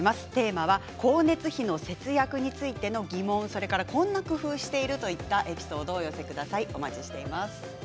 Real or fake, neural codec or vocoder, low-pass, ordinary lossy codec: real; none; none; none